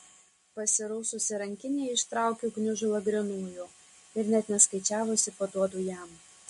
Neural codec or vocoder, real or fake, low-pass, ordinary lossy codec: none; real; 14.4 kHz; MP3, 48 kbps